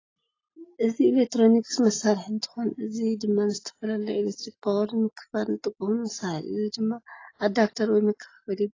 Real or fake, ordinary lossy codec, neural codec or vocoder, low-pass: real; AAC, 32 kbps; none; 7.2 kHz